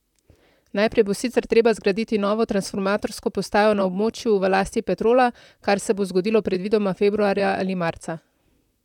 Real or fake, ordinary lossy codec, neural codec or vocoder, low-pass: fake; none; vocoder, 44.1 kHz, 128 mel bands, Pupu-Vocoder; 19.8 kHz